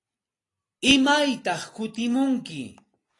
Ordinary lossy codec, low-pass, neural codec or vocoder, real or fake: AAC, 32 kbps; 10.8 kHz; none; real